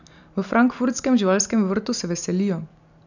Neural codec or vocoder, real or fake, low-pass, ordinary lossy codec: none; real; 7.2 kHz; none